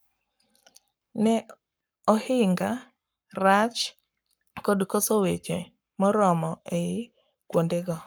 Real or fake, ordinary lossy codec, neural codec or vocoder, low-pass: fake; none; codec, 44.1 kHz, 7.8 kbps, Pupu-Codec; none